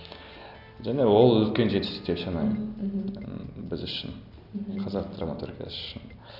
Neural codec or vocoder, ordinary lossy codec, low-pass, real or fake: none; none; 5.4 kHz; real